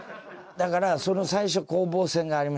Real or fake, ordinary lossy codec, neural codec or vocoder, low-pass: real; none; none; none